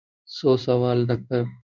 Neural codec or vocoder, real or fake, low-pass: codec, 16 kHz in and 24 kHz out, 1 kbps, XY-Tokenizer; fake; 7.2 kHz